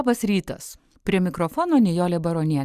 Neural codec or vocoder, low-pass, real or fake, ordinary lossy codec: none; 14.4 kHz; real; Opus, 64 kbps